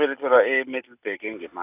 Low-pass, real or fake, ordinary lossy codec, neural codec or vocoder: 3.6 kHz; real; none; none